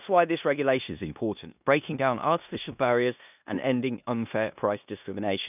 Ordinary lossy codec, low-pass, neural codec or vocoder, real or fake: none; 3.6 kHz; codec, 16 kHz in and 24 kHz out, 0.9 kbps, LongCat-Audio-Codec, four codebook decoder; fake